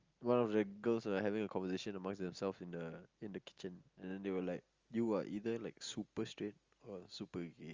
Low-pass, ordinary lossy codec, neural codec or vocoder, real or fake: 7.2 kHz; Opus, 32 kbps; none; real